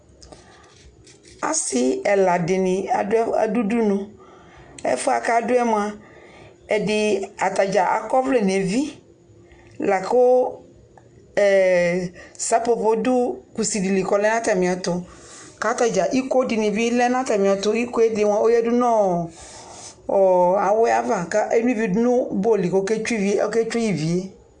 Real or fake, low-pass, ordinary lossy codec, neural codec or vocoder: real; 9.9 kHz; MP3, 64 kbps; none